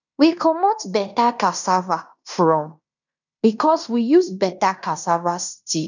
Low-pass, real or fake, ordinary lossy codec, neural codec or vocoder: 7.2 kHz; fake; none; codec, 16 kHz in and 24 kHz out, 0.9 kbps, LongCat-Audio-Codec, fine tuned four codebook decoder